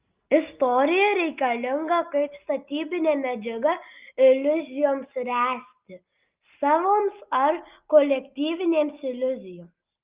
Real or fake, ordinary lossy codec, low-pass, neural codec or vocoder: real; Opus, 32 kbps; 3.6 kHz; none